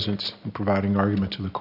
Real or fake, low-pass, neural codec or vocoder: real; 5.4 kHz; none